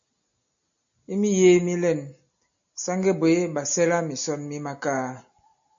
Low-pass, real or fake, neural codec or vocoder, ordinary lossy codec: 7.2 kHz; real; none; AAC, 64 kbps